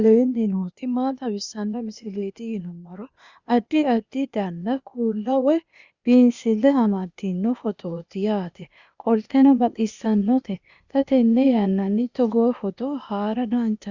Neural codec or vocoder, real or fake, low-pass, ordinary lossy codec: codec, 16 kHz, 0.8 kbps, ZipCodec; fake; 7.2 kHz; Opus, 64 kbps